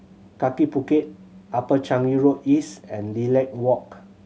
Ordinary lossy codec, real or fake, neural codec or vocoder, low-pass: none; real; none; none